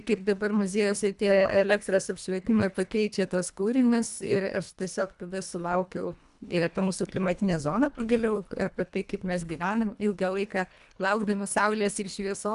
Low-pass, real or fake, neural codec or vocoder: 10.8 kHz; fake; codec, 24 kHz, 1.5 kbps, HILCodec